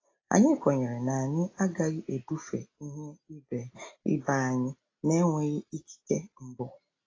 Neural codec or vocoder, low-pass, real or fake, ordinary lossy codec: none; 7.2 kHz; real; AAC, 32 kbps